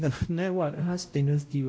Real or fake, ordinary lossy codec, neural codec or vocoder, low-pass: fake; none; codec, 16 kHz, 0.5 kbps, X-Codec, WavLM features, trained on Multilingual LibriSpeech; none